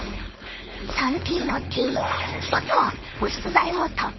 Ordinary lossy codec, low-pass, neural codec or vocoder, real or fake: MP3, 24 kbps; 7.2 kHz; codec, 16 kHz, 4.8 kbps, FACodec; fake